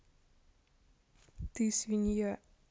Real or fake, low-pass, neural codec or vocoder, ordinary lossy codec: real; none; none; none